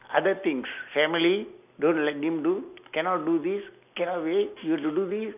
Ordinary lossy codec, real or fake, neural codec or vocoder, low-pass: none; real; none; 3.6 kHz